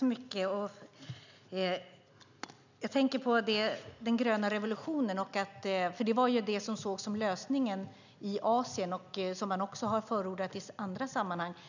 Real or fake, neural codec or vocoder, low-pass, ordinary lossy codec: real; none; 7.2 kHz; none